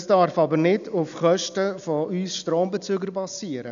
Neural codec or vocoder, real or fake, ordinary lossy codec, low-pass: none; real; none; 7.2 kHz